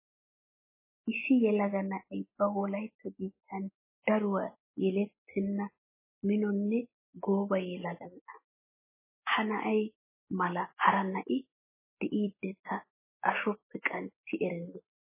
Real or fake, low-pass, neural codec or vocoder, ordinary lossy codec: real; 3.6 kHz; none; MP3, 16 kbps